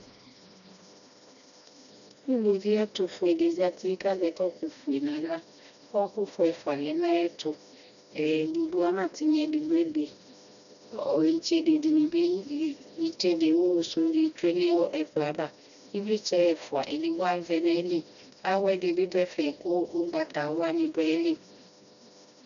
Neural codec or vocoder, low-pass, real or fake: codec, 16 kHz, 1 kbps, FreqCodec, smaller model; 7.2 kHz; fake